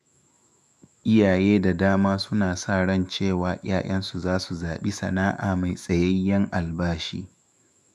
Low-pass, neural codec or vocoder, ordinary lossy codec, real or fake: 14.4 kHz; autoencoder, 48 kHz, 128 numbers a frame, DAC-VAE, trained on Japanese speech; none; fake